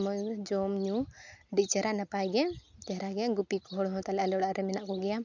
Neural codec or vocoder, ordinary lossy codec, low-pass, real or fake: none; none; 7.2 kHz; real